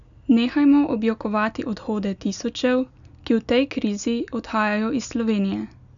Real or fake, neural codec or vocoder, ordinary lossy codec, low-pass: real; none; none; 7.2 kHz